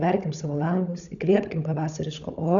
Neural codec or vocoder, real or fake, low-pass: codec, 16 kHz, 16 kbps, FunCodec, trained on LibriTTS, 50 frames a second; fake; 7.2 kHz